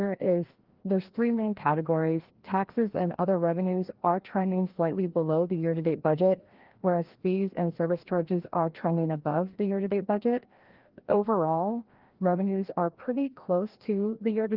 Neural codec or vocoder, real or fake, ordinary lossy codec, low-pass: codec, 16 kHz, 1 kbps, FreqCodec, larger model; fake; Opus, 16 kbps; 5.4 kHz